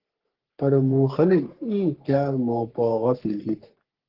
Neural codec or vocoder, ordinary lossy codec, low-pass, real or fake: codec, 44.1 kHz, 3.4 kbps, Pupu-Codec; Opus, 16 kbps; 5.4 kHz; fake